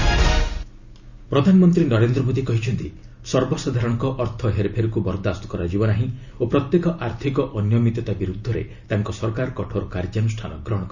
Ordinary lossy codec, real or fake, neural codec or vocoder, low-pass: none; real; none; 7.2 kHz